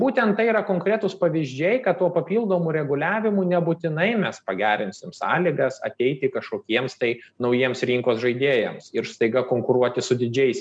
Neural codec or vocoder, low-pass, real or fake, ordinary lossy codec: none; 9.9 kHz; real; MP3, 96 kbps